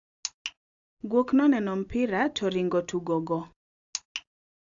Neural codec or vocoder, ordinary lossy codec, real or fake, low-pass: none; AAC, 64 kbps; real; 7.2 kHz